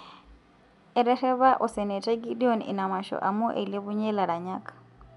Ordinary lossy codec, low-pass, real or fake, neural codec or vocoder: none; 10.8 kHz; real; none